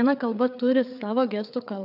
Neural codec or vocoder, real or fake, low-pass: codec, 16 kHz, 4 kbps, FreqCodec, larger model; fake; 5.4 kHz